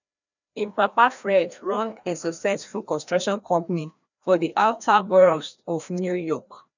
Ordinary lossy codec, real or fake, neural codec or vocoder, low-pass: none; fake; codec, 16 kHz, 1 kbps, FreqCodec, larger model; 7.2 kHz